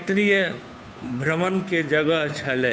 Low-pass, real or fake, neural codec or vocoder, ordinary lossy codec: none; fake; codec, 16 kHz, 2 kbps, FunCodec, trained on Chinese and English, 25 frames a second; none